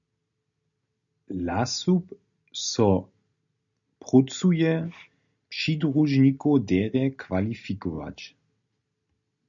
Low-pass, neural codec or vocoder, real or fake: 7.2 kHz; none; real